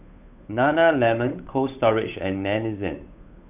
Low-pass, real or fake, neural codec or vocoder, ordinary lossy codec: 3.6 kHz; fake; codec, 16 kHz, 8 kbps, FunCodec, trained on Chinese and English, 25 frames a second; none